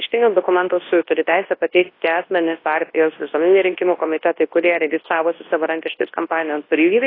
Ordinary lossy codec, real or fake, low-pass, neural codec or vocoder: AAC, 24 kbps; fake; 5.4 kHz; codec, 24 kHz, 0.9 kbps, WavTokenizer, large speech release